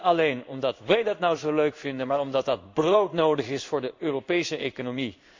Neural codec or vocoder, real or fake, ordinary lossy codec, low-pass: codec, 16 kHz in and 24 kHz out, 1 kbps, XY-Tokenizer; fake; MP3, 64 kbps; 7.2 kHz